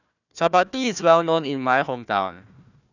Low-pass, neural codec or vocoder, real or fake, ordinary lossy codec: 7.2 kHz; codec, 16 kHz, 1 kbps, FunCodec, trained on Chinese and English, 50 frames a second; fake; none